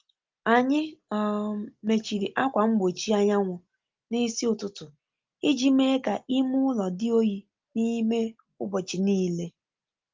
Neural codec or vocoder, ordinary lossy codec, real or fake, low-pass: none; Opus, 32 kbps; real; 7.2 kHz